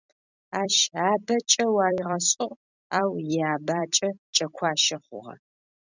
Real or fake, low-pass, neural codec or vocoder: fake; 7.2 kHz; vocoder, 44.1 kHz, 128 mel bands every 256 samples, BigVGAN v2